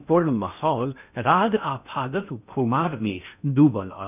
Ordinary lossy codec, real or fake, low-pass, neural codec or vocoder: none; fake; 3.6 kHz; codec, 16 kHz in and 24 kHz out, 0.6 kbps, FocalCodec, streaming, 4096 codes